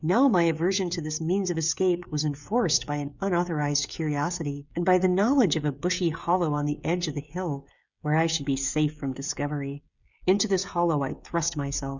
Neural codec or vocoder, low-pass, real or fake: codec, 16 kHz, 16 kbps, FreqCodec, smaller model; 7.2 kHz; fake